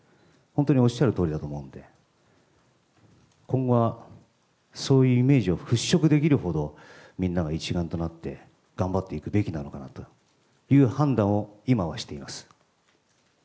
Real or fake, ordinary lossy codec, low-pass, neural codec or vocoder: real; none; none; none